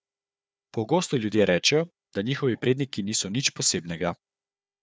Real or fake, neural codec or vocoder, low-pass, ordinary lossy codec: fake; codec, 16 kHz, 4 kbps, FunCodec, trained on Chinese and English, 50 frames a second; none; none